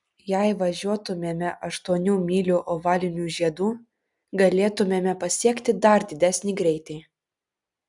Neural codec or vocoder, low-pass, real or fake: none; 10.8 kHz; real